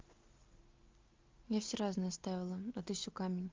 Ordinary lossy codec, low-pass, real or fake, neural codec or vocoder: Opus, 32 kbps; 7.2 kHz; real; none